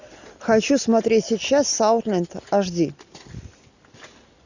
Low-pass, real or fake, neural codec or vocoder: 7.2 kHz; real; none